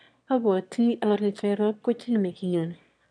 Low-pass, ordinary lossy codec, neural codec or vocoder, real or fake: 9.9 kHz; none; autoencoder, 22.05 kHz, a latent of 192 numbers a frame, VITS, trained on one speaker; fake